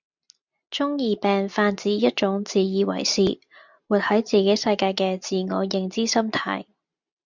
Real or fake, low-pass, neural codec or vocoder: real; 7.2 kHz; none